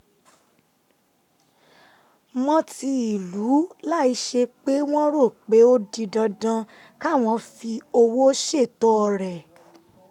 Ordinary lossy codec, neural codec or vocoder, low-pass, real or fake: none; codec, 44.1 kHz, 7.8 kbps, Pupu-Codec; 19.8 kHz; fake